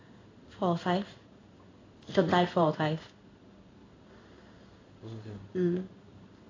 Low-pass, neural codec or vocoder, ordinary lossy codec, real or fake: 7.2 kHz; codec, 16 kHz in and 24 kHz out, 1 kbps, XY-Tokenizer; AAC, 32 kbps; fake